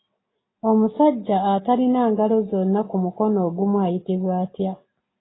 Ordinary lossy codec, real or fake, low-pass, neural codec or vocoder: AAC, 16 kbps; real; 7.2 kHz; none